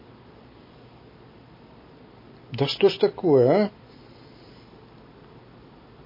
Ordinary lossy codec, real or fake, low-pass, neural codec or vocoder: MP3, 24 kbps; real; 5.4 kHz; none